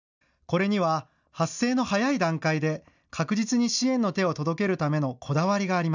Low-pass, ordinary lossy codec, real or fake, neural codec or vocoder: 7.2 kHz; none; real; none